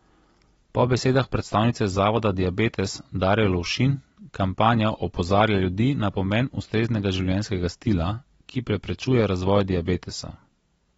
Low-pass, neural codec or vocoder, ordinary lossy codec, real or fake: 19.8 kHz; none; AAC, 24 kbps; real